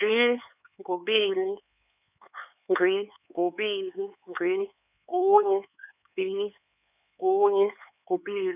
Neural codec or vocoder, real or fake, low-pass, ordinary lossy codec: codec, 16 kHz, 4 kbps, X-Codec, HuBERT features, trained on LibriSpeech; fake; 3.6 kHz; none